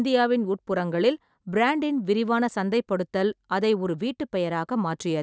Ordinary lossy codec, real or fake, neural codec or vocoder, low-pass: none; real; none; none